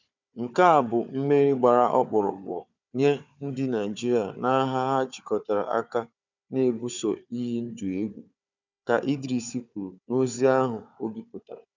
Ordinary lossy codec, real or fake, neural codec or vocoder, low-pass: none; fake; codec, 16 kHz, 4 kbps, FunCodec, trained on Chinese and English, 50 frames a second; 7.2 kHz